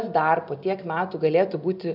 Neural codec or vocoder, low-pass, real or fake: none; 5.4 kHz; real